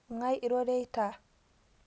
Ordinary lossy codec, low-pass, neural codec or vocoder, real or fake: none; none; none; real